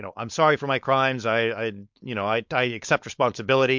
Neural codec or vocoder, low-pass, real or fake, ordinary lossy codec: codec, 16 kHz, 4.8 kbps, FACodec; 7.2 kHz; fake; MP3, 64 kbps